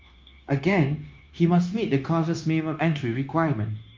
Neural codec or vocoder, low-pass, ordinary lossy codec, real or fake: codec, 16 kHz, 0.9 kbps, LongCat-Audio-Codec; 7.2 kHz; Opus, 32 kbps; fake